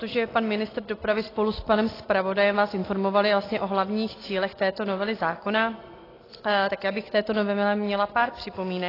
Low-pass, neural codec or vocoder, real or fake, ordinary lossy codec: 5.4 kHz; none; real; AAC, 24 kbps